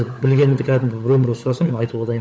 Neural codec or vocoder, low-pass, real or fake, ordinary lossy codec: codec, 16 kHz, 16 kbps, FunCodec, trained on LibriTTS, 50 frames a second; none; fake; none